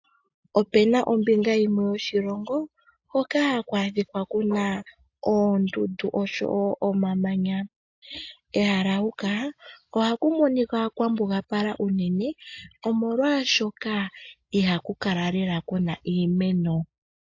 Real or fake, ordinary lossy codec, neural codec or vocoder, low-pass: real; AAC, 48 kbps; none; 7.2 kHz